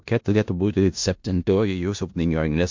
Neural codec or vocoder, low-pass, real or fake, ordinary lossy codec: codec, 16 kHz in and 24 kHz out, 0.4 kbps, LongCat-Audio-Codec, four codebook decoder; 7.2 kHz; fake; MP3, 48 kbps